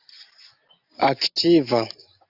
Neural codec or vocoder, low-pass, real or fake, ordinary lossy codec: none; 5.4 kHz; real; AAC, 48 kbps